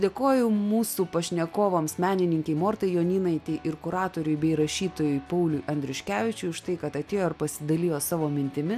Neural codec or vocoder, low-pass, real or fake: none; 14.4 kHz; real